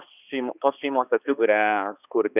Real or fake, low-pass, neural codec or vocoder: fake; 3.6 kHz; codec, 16 kHz, 2 kbps, X-Codec, HuBERT features, trained on balanced general audio